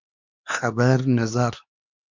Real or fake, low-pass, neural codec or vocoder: fake; 7.2 kHz; codec, 16 kHz, 2 kbps, X-Codec, HuBERT features, trained on LibriSpeech